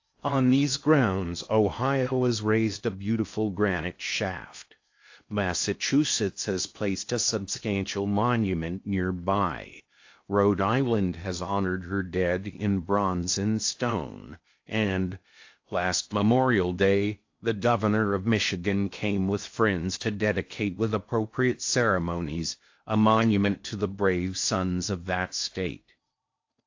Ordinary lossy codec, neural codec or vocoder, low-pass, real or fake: AAC, 48 kbps; codec, 16 kHz in and 24 kHz out, 0.6 kbps, FocalCodec, streaming, 2048 codes; 7.2 kHz; fake